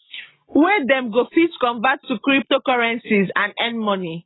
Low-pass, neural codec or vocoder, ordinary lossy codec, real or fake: 7.2 kHz; none; AAC, 16 kbps; real